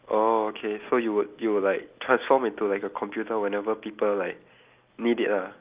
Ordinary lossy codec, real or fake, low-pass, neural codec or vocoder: Opus, 24 kbps; real; 3.6 kHz; none